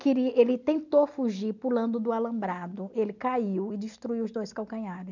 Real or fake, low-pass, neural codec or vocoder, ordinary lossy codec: real; 7.2 kHz; none; none